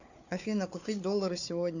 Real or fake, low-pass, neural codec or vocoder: fake; 7.2 kHz; codec, 16 kHz, 4 kbps, FunCodec, trained on Chinese and English, 50 frames a second